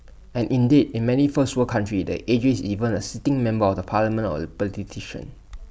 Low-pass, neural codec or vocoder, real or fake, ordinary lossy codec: none; none; real; none